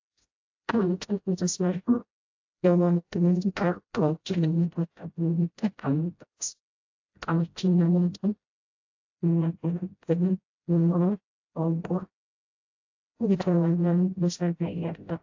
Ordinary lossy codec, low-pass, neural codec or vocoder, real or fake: AAC, 48 kbps; 7.2 kHz; codec, 16 kHz, 0.5 kbps, FreqCodec, smaller model; fake